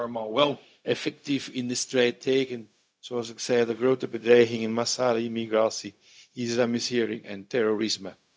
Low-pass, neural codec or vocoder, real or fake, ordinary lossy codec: none; codec, 16 kHz, 0.4 kbps, LongCat-Audio-Codec; fake; none